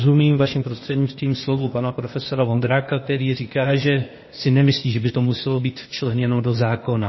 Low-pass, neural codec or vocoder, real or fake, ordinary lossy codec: 7.2 kHz; codec, 16 kHz, 0.8 kbps, ZipCodec; fake; MP3, 24 kbps